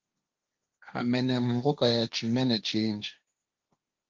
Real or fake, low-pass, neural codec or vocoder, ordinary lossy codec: fake; 7.2 kHz; codec, 16 kHz, 1.1 kbps, Voila-Tokenizer; Opus, 32 kbps